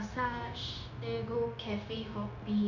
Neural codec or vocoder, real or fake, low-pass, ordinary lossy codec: codec, 16 kHz, 0.9 kbps, LongCat-Audio-Codec; fake; 7.2 kHz; none